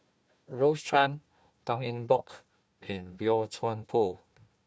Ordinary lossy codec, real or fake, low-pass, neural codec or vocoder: none; fake; none; codec, 16 kHz, 1 kbps, FunCodec, trained on Chinese and English, 50 frames a second